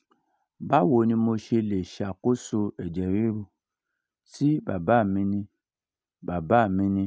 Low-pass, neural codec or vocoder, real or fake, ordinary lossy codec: none; none; real; none